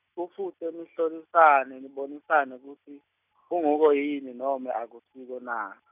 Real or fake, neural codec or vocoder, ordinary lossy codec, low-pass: real; none; none; 3.6 kHz